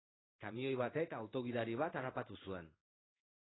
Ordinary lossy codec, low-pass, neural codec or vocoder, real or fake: AAC, 16 kbps; 7.2 kHz; codec, 16 kHz in and 24 kHz out, 1 kbps, XY-Tokenizer; fake